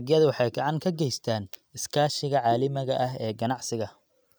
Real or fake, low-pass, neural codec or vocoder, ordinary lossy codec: real; none; none; none